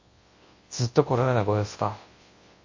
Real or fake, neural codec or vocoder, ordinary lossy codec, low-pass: fake; codec, 24 kHz, 0.9 kbps, WavTokenizer, large speech release; MP3, 32 kbps; 7.2 kHz